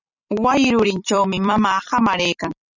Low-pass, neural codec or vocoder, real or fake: 7.2 kHz; vocoder, 44.1 kHz, 128 mel bands every 256 samples, BigVGAN v2; fake